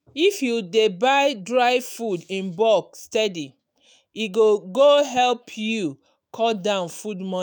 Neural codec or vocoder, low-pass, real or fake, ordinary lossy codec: autoencoder, 48 kHz, 128 numbers a frame, DAC-VAE, trained on Japanese speech; none; fake; none